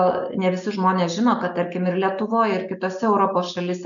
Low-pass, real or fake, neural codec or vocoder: 7.2 kHz; real; none